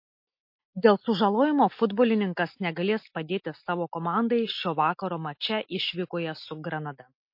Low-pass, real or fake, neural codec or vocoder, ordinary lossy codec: 5.4 kHz; real; none; MP3, 32 kbps